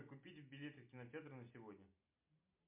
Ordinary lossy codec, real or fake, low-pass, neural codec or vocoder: MP3, 32 kbps; real; 3.6 kHz; none